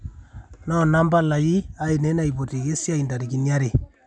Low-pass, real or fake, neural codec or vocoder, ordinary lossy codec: 9.9 kHz; real; none; none